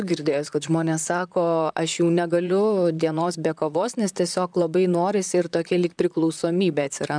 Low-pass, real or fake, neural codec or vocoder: 9.9 kHz; fake; vocoder, 44.1 kHz, 128 mel bands, Pupu-Vocoder